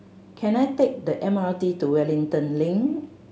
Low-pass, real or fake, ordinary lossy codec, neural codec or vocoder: none; real; none; none